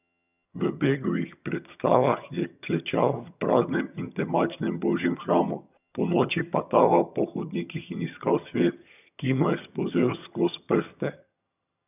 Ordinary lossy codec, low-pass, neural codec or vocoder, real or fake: none; 3.6 kHz; vocoder, 22.05 kHz, 80 mel bands, HiFi-GAN; fake